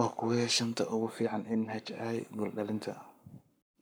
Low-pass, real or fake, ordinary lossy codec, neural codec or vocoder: none; fake; none; codec, 44.1 kHz, 7.8 kbps, Pupu-Codec